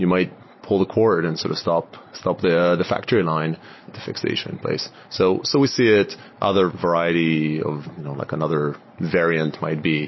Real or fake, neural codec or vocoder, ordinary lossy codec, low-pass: real; none; MP3, 24 kbps; 7.2 kHz